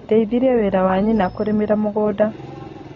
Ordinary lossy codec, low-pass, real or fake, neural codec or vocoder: AAC, 32 kbps; 7.2 kHz; real; none